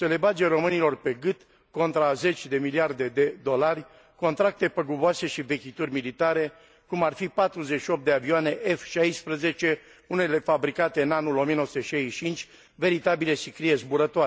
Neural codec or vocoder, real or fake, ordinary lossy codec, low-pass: none; real; none; none